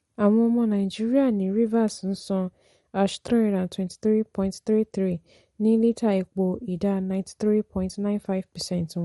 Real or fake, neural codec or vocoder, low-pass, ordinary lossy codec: real; none; 19.8 kHz; MP3, 48 kbps